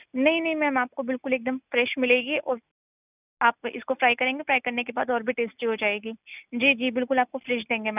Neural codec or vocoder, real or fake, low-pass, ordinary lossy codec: none; real; 3.6 kHz; none